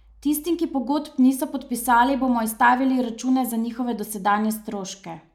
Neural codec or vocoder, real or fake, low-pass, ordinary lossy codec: none; real; 19.8 kHz; none